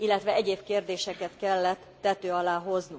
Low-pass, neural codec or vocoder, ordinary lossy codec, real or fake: none; none; none; real